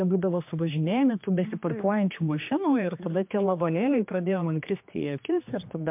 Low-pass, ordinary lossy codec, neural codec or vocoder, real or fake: 3.6 kHz; MP3, 32 kbps; codec, 16 kHz, 2 kbps, X-Codec, HuBERT features, trained on general audio; fake